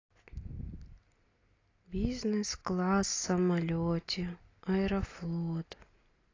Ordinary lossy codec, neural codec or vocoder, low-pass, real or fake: AAC, 32 kbps; none; 7.2 kHz; real